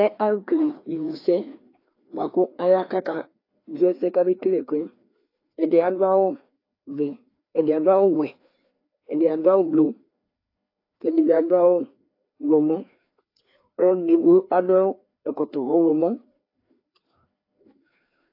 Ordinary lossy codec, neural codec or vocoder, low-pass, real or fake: AAC, 32 kbps; codec, 16 kHz, 2 kbps, FreqCodec, larger model; 5.4 kHz; fake